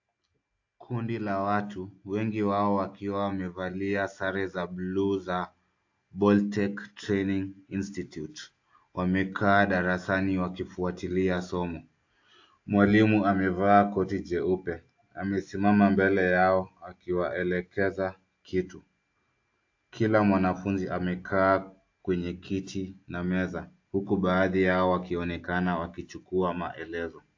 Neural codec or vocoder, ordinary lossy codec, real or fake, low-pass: none; AAC, 48 kbps; real; 7.2 kHz